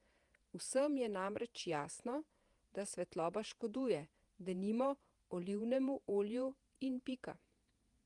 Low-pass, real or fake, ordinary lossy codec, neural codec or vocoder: 10.8 kHz; fake; Opus, 32 kbps; vocoder, 48 kHz, 128 mel bands, Vocos